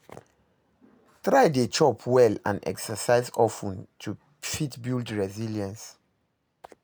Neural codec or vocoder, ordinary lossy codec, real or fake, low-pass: none; none; real; none